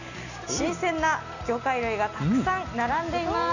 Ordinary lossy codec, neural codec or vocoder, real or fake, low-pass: none; none; real; 7.2 kHz